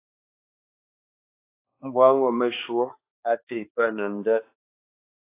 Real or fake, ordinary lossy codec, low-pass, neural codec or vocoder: fake; AAC, 24 kbps; 3.6 kHz; codec, 16 kHz, 2 kbps, X-Codec, HuBERT features, trained on balanced general audio